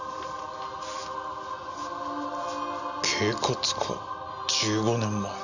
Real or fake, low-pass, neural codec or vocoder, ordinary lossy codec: real; 7.2 kHz; none; none